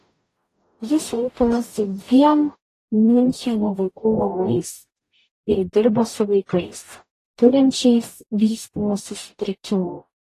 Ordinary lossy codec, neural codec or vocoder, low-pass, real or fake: AAC, 48 kbps; codec, 44.1 kHz, 0.9 kbps, DAC; 14.4 kHz; fake